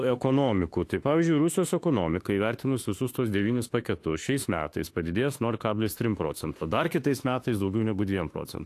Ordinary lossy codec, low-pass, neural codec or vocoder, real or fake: AAC, 64 kbps; 14.4 kHz; autoencoder, 48 kHz, 32 numbers a frame, DAC-VAE, trained on Japanese speech; fake